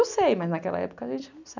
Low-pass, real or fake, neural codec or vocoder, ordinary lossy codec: 7.2 kHz; real; none; none